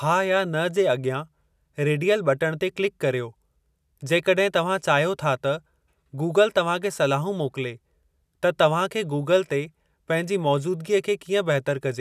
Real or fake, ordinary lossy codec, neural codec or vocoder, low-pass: real; none; none; 14.4 kHz